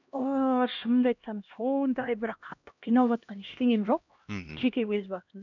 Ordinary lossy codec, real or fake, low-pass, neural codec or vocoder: none; fake; 7.2 kHz; codec, 16 kHz, 1 kbps, X-Codec, HuBERT features, trained on LibriSpeech